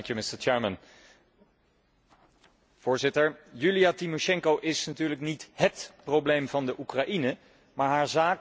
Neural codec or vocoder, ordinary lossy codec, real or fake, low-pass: none; none; real; none